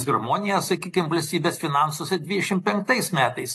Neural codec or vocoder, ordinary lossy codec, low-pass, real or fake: none; AAC, 48 kbps; 14.4 kHz; real